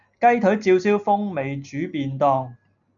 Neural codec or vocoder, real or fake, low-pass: none; real; 7.2 kHz